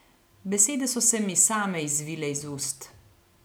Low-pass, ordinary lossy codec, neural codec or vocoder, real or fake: none; none; none; real